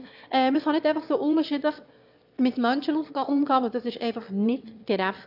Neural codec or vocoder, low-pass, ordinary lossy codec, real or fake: autoencoder, 22.05 kHz, a latent of 192 numbers a frame, VITS, trained on one speaker; 5.4 kHz; AAC, 48 kbps; fake